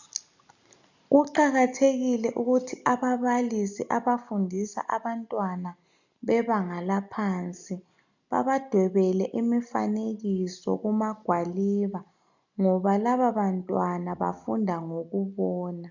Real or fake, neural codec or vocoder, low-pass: real; none; 7.2 kHz